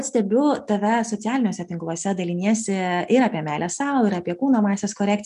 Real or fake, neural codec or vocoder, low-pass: real; none; 10.8 kHz